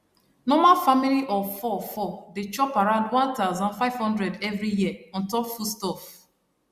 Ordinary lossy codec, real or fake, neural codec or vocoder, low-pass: Opus, 64 kbps; real; none; 14.4 kHz